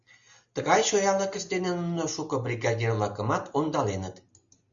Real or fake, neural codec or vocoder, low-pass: real; none; 7.2 kHz